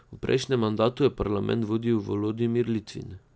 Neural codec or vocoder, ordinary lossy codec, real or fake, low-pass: none; none; real; none